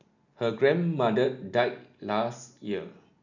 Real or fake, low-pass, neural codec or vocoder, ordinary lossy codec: real; 7.2 kHz; none; none